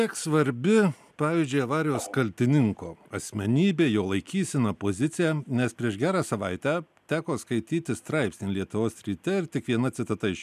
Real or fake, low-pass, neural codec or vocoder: real; 14.4 kHz; none